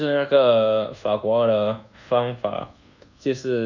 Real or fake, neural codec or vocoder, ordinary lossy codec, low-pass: fake; codec, 24 kHz, 1.2 kbps, DualCodec; none; 7.2 kHz